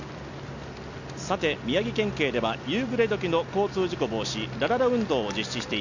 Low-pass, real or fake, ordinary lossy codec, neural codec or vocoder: 7.2 kHz; real; none; none